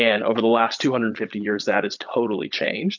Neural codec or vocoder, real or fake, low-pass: vocoder, 22.05 kHz, 80 mel bands, Vocos; fake; 7.2 kHz